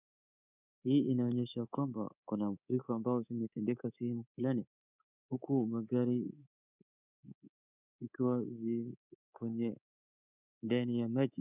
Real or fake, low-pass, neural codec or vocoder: fake; 3.6 kHz; codec, 24 kHz, 3.1 kbps, DualCodec